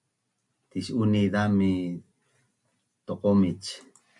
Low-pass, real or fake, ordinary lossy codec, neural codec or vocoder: 10.8 kHz; real; MP3, 96 kbps; none